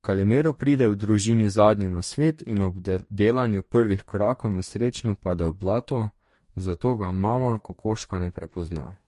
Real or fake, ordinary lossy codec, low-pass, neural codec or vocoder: fake; MP3, 48 kbps; 14.4 kHz; codec, 44.1 kHz, 2.6 kbps, DAC